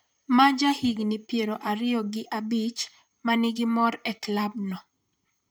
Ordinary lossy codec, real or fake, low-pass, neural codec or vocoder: none; fake; none; vocoder, 44.1 kHz, 128 mel bands, Pupu-Vocoder